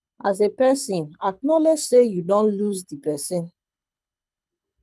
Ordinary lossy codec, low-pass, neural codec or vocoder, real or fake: none; none; codec, 24 kHz, 6 kbps, HILCodec; fake